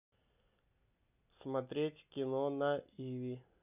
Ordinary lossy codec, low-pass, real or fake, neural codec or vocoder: none; 3.6 kHz; real; none